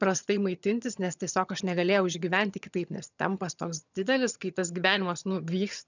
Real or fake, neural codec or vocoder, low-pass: fake; vocoder, 22.05 kHz, 80 mel bands, HiFi-GAN; 7.2 kHz